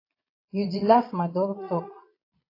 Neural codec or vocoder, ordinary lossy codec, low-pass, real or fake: vocoder, 22.05 kHz, 80 mel bands, Vocos; AAC, 32 kbps; 5.4 kHz; fake